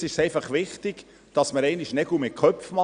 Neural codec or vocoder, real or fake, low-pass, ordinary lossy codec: none; real; 9.9 kHz; Opus, 64 kbps